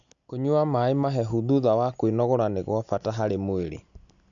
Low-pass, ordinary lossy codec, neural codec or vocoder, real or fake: 7.2 kHz; none; none; real